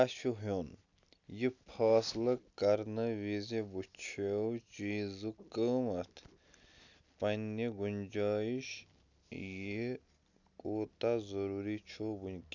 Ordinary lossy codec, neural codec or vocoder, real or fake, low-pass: none; none; real; 7.2 kHz